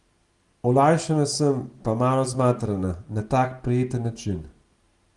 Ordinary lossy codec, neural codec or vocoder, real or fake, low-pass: Opus, 24 kbps; none; real; 10.8 kHz